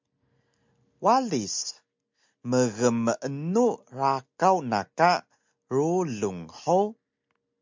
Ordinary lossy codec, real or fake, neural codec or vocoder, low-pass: MP3, 64 kbps; real; none; 7.2 kHz